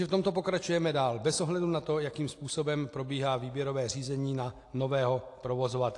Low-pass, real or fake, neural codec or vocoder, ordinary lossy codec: 10.8 kHz; real; none; AAC, 48 kbps